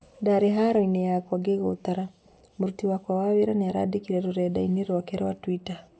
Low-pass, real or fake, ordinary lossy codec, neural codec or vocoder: none; real; none; none